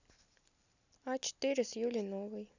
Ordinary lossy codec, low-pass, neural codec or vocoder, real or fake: none; 7.2 kHz; none; real